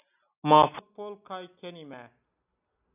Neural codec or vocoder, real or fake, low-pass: none; real; 3.6 kHz